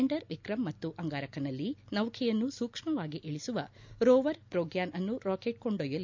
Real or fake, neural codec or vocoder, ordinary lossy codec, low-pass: real; none; MP3, 64 kbps; 7.2 kHz